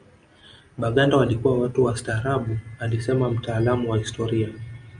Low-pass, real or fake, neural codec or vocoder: 9.9 kHz; real; none